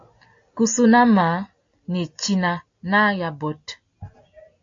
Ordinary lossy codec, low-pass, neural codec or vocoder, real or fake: AAC, 64 kbps; 7.2 kHz; none; real